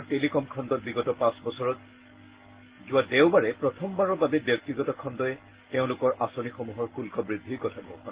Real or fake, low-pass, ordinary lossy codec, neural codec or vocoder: real; 3.6 kHz; Opus, 16 kbps; none